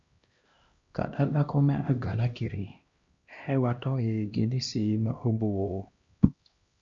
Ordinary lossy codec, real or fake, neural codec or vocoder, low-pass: Opus, 64 kbps; fake; codec, 16 kHz, 1 kbps, X-Codec, HuBERT features, trained on LibriSpeech; 7.2 kHz